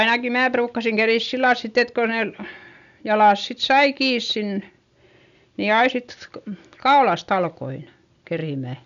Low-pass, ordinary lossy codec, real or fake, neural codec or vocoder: 7.2 kHz; none; real; none